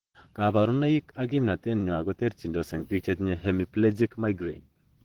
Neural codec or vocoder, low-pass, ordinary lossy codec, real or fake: vocoder, 44.1 kHz, 128 mel bands, Pupu-Vocoder; 19.8 kHz; Opus, 16 kbps; fake